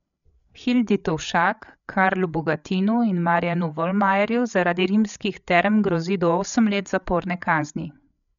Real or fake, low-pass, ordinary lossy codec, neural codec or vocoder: fake; 7.2 kHz; none; codec, 16 kHz, 8 kbps, FreqCodec, larger model